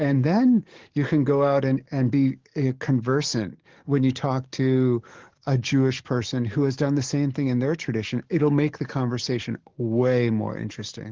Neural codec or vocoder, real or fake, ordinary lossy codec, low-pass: codec, 44.1 kHz, 7.8 kbps, DAC; fake; Opus, 16 kbps; 7.2 kHz